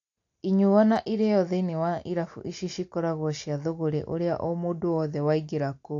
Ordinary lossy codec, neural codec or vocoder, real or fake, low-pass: AAC, 48 kbps; none; real; 7.2 kHz